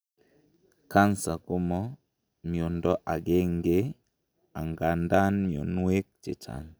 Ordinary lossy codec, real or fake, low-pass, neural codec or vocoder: none; real; none; none